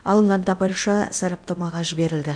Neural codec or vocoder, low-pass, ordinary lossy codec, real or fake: codec, 16 kHz in and 24 kHz out, 0.8 kbps, FocalCodec, streaming, 65536 codes; 9.9 kHz; none; fake